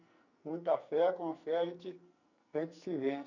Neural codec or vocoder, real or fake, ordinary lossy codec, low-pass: codec, 44.1 kHz, 2.6 kbps, SNAC; fake; none; 7.2 kHz